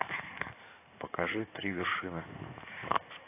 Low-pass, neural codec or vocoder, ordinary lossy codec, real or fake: 3.6 kHz; codec, 16 kHz in and 24 kHz out, 2.2 kbps, FireRedTTS-2 codec; AAC, 24 kbps; fake